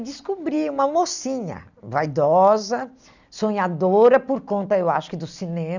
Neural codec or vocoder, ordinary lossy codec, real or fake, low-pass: none; none; real; 7.2 kHz